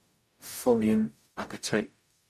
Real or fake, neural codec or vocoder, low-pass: fake; codec, 44.1 kHz, 0.9 kbps, DAC; 14.4 kHz